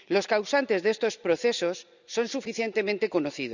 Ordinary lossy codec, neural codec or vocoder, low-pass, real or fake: none; none; 7.2 kHz; real